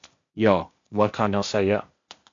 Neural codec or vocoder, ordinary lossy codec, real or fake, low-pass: codec, 16 kHz, 0.8 kbps, ZipCodec; MP3, 64 kbps; fake; 7.2 kHz